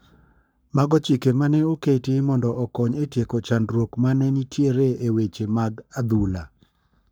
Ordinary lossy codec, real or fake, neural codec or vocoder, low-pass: none; fake; codec, 44.1 kHz, 7.8 kbps, Pupu-Codec; none